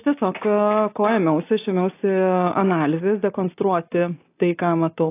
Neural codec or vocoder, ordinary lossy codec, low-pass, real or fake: none; AAC, 24 kbps; 3.6 kHz; real